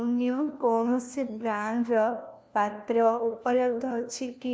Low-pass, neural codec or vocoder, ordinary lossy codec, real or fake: none; codec, 16 kHz, 1 kbps, FunCodec, trained on LibriTTS, 50 frames a second; none; fake